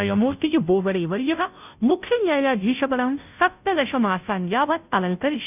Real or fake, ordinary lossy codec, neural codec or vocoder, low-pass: fake; none; codec, 16 kHz, 0.5 kbps, FunCodec, trained on Chinese and English, 25 frames a second; 3.6 kHz